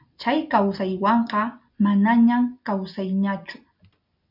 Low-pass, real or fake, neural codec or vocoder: 5.4 kHz; real; none